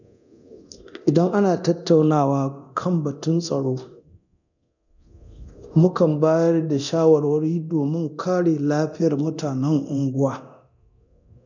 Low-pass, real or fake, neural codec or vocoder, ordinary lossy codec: 7.2 kHz; fake; codec, 24 kHz, 0.9 kbps, DualCodec; none